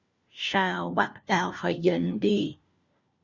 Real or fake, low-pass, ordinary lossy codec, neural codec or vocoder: fake; 7.2 kHz; Opus, 64 kbps; codec, 16 kHz, 1 kbps, FunCodec, trained on LibriTTS, 50 frames a second